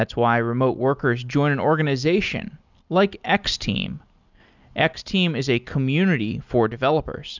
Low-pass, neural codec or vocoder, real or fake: 7.2 kHz; none; real